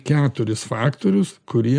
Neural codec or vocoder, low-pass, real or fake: none; 9.9 kHz; real